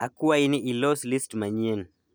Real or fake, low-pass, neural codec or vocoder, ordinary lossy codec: real; none; none; none